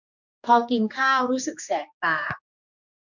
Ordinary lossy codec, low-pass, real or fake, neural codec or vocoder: none; 7.2 kHz; fake; codec, 16 kHz, 2 kbps, X-Codec, HuBERT features, trained on general audio